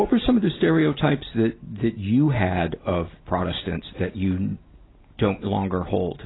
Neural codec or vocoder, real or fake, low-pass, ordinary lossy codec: none; real; 7.2 kHz; AAC, 16 kbps